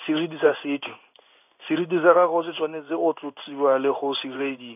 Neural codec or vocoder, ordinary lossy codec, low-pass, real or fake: codec, 16 kHz in and 24 kHz out, 1 kbps, XY-Tokenizer; none; 3.6 kHz; fake